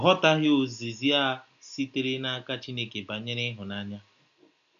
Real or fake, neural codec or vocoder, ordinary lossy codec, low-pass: real; none; none; 7.2 kHz